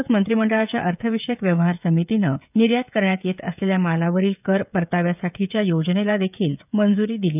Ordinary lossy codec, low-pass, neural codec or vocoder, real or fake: none; 3.6 kHz; codec, 16 kHz, 16 kbps, FreqCodec, smaller model; fake